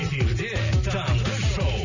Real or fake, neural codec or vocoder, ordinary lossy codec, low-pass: real; none; none; 7.2 kHz